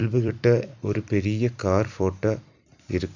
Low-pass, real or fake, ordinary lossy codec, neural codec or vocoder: 7.2 kHz; fake; none; vocoder, 44.1 kHz, 128 mel bands every 256 samples, BigVGAN v2